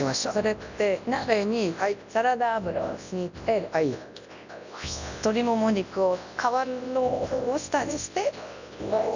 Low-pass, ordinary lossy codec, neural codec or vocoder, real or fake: 7.2 kHz; none; codec, 24 kHz, 0.9 kbps, WavTokenizer, large speech release; fake